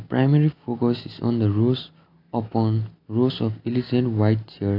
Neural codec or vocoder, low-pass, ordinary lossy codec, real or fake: none; 5.4 kHz; AAC, 32 kbps; real